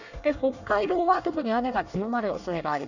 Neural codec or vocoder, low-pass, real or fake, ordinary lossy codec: codec, 24 kHz, 1 kbps, SNAC; 7.2 kHz; fake; none